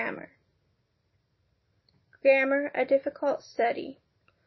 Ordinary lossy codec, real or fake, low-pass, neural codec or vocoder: MP3, 24 kbps; real; 7.2 kHz; none